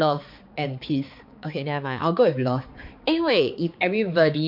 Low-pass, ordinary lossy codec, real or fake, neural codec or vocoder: 5.4 kHz; MP3, 48 kbps; fake; codec, 16 kHz, 4 kbps, X-Codec, HuBERT features, trained on balanced general audio